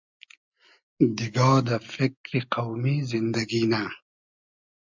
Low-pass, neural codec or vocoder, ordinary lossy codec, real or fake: 7.2 kHz; none; MP3, 64 kbps; real